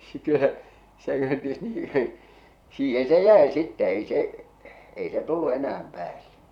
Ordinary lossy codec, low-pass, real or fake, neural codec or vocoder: none; 19.8 kHz; fake; vocoder, 44.1 kHz, 128 mel bands, Pupu-Vocoder